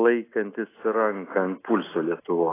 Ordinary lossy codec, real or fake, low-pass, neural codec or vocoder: AAC, 16 kbps; real; 3.6 kHz; none